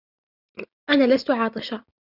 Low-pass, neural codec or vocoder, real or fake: 5.4 kHz; none; real